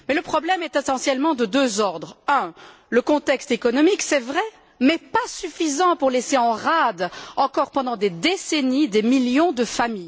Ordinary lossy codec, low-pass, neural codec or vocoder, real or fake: none; none; none; real